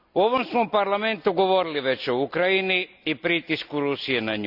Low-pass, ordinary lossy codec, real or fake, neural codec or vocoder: 5.4 kHz; none; real; none